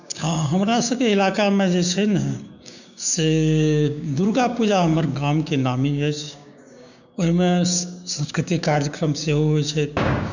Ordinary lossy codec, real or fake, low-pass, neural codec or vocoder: none; fake; 7.2 kHz; codec, 16 kHz, 6 kbps, DAC